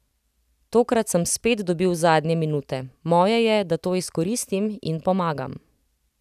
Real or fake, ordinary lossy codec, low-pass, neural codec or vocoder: real; none; 14.4 kHz; none